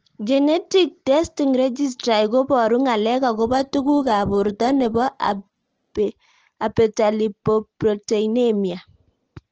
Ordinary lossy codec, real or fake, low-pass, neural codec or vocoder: Opus, 16 kbps; real; 7.2 kHz; none